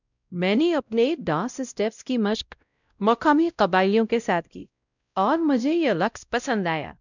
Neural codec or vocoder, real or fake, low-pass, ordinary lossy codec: codec, 16 kHz, 0.5 kbps, X-Codec, WavLM features, trained on Multilingual LibriSpeech; fake; 7.2 kHz; none